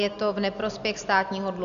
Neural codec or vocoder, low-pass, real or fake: none; 7.2 kHz; real